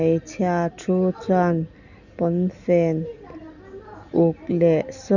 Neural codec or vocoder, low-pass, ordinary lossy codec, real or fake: none; 7.2 kHz; none; real